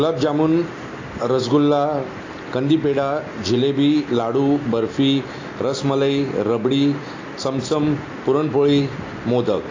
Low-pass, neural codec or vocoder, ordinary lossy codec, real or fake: 7.2 kHz; none; AAC, 32 kbps; real